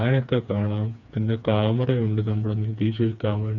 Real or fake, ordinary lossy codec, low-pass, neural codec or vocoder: fake; none; 7.2 kHz; codec, 16 kHz, 4 kbps, FreqCodec, smaller model